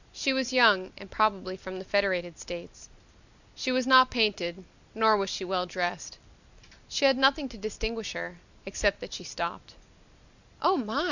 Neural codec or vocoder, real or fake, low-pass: none; real; 7.2 kHz